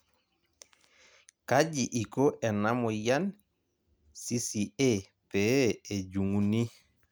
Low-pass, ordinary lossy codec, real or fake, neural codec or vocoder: none; none; real; none